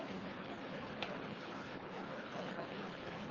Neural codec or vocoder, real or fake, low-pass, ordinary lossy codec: codec, 24 kHz, 3 kbps, HILCodec; fake; 7.2 kHz; Opus, 32 kbps